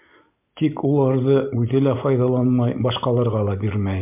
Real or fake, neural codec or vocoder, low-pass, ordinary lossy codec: real; none; 3.6 kHz; MP3, 32 kbps